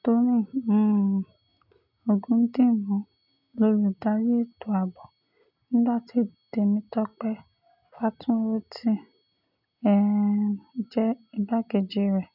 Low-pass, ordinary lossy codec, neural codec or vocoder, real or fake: 5.4 kHz; none; none; real